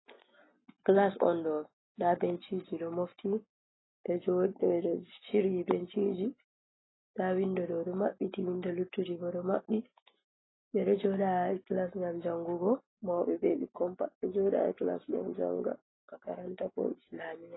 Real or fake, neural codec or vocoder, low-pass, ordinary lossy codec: real; none; 7.2 kHz; AAC, 16 kbps